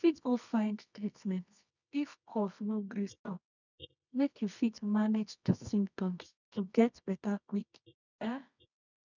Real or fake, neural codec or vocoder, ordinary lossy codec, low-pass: fake; codec, 24 kHz, 0.9 kbps, WavTokenizer, medium music audio release; none; 7.2 kHz